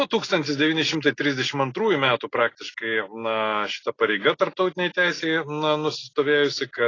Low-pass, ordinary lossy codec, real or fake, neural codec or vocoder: 7.2 kHz; AAC, 32 kbps; real; none